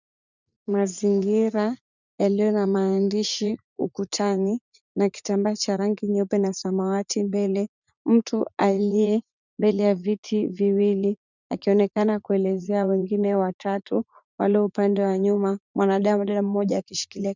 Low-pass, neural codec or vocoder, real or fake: 7.2 kHz; vocoder, 44.1 kHz, 80 mel bands, Vocos; fake